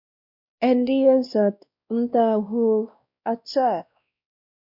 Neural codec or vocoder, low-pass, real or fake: codec, 16 kHz, 1 kbps, X-Codec, WavLM features, trained on Multilingual LibriSpeech; 5.4 kHz; fake